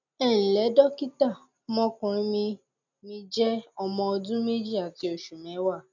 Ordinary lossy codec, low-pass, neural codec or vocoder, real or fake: none; 7.2 kHz; none; real